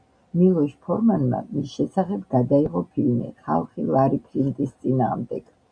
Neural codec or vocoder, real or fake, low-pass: none; real; 9.9 kHz